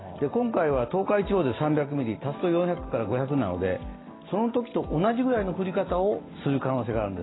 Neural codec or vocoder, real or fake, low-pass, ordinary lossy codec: none; real; 7.2 kHz; AAC, 16 kbps